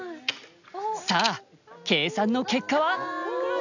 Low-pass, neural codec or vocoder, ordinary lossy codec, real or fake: 7.2 kHz; none; none; real